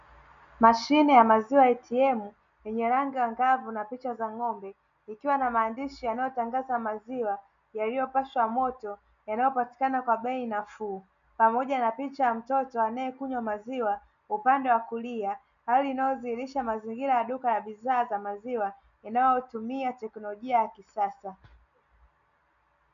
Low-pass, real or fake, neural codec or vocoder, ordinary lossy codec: 7.2 kHz; real; none; MP3, 96 kbps